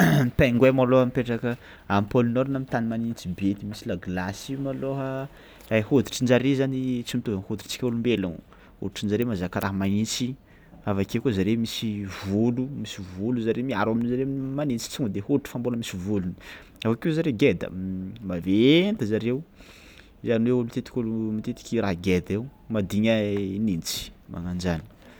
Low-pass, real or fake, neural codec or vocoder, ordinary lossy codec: none; real; none; none